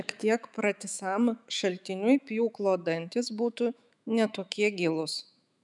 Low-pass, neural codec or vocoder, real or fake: 10.8 kHz; autoencoder, 48 kHz, 128 numbers a frame, DAC-VAE, trained on Japanese speech; fake